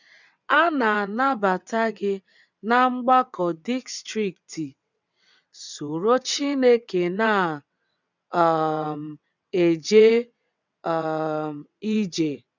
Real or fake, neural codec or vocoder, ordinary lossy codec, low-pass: fake; vocoder, 22.05 kHz, 80 mel bands, WaveNeXt; none; 7.2 kHz